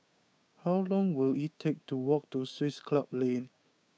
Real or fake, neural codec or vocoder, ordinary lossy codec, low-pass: fake; codec, 16 kHz, 6 kbps, DAC; none; none